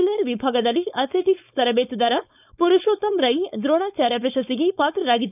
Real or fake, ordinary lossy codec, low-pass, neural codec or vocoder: fake; none; 3.6 kHz; codec, 16 kHz, 4.8 kbps, FACodec